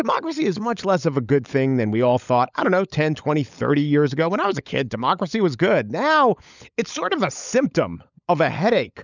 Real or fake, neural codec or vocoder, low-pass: fake; codec, 16 kHz, 16 kbps, FunCodec, trained on LibriTTS, 50 frames a second; 7.2 kHz